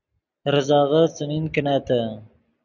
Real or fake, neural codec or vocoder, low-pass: real; none; 7.2 kHz